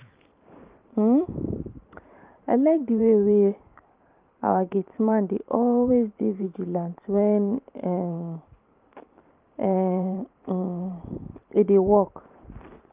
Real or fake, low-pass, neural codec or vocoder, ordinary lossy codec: fake; 3.6 kHz; vocoder, 44.1 kHz, 128 mel bands every 512 samples, BigVGAN v2; Opus, 24 kbps